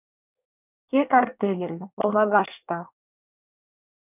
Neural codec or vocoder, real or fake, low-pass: codec, 24 kHz, 1 kbps, SNAC; fake; 3.6 kHz